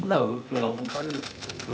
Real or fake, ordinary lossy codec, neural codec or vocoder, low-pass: fake; none; codec, 16 kHz, 0.8 kbps, ZipCodec; none